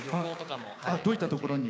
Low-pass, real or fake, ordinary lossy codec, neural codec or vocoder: none; fake; none; codec, 16 kHz, 6 kbps, DAC